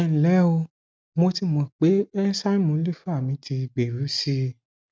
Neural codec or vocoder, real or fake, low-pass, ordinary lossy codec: none; real; none; none